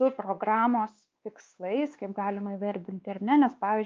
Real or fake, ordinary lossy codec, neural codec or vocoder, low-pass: fake; Opus, 24 kbps; codec, 16 kHz, 2 kbps, X-Codec, WavLM features, trained on Multilingual LibriSpeech; 7.2 kHz